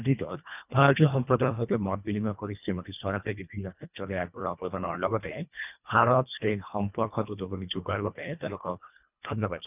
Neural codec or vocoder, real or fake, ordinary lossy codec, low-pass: codec, 24 kHz, 1.5 kbps, HILCodec; fake; none; 3.6 kHz